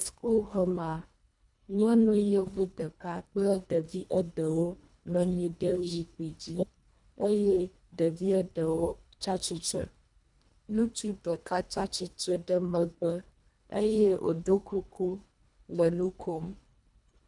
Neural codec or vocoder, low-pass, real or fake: codec, 24 kHz, 1.5 kbps, HILCodec; 10.8 kHz; fake